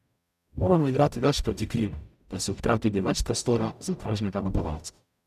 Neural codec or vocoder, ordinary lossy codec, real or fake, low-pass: codec, 44.1 kHz, 0.9 kbps, DAC; none; fake; 14.4 kHz